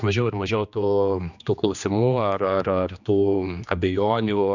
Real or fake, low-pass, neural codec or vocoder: fake; 7.2 kHz; codec, 16 kHz, 2 kbps, X-Codec, HuBERT features, trained on general audio